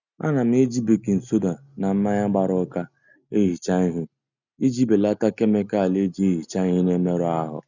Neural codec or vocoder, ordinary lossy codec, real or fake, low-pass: none; none; real; 7.2 kHz